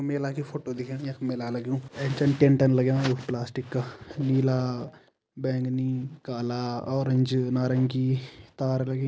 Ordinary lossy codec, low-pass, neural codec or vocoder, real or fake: none; none; none; real